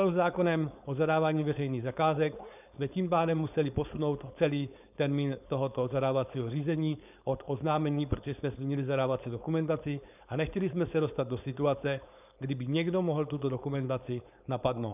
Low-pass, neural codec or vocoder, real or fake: 3.6 kHz; codec, 16 kHz, 4.8 kbps, FACodec; fake